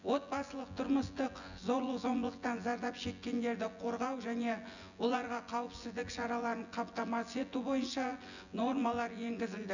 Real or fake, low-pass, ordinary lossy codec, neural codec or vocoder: fake; 7.2 kHz; none; vocoder, 24 kHz, 100 mel bands, Vocos